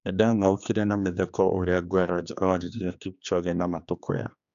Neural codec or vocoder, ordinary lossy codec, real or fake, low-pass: codec, 16 kHz, 2 kbps, X-Codec, HuBERT features, trained on general audio; AAC, 96 kbps; fake; 7.2 kHz